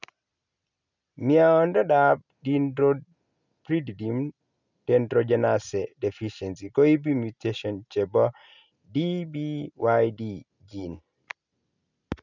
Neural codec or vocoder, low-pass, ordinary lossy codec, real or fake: none; 7.2 kHz; none; real